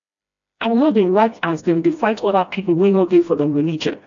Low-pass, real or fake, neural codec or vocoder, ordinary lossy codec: 7.2 kHz; fake; codec, 16 kHz, 1 kbps, FreqCodec, smaller model; AAC, 64 kbps